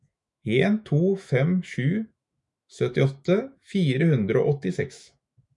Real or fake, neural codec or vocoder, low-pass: fake; autoencoder, 48 kHz, 128 numbers a frame, DAC-VAE, trained on Japanese speech; 10.8 kHz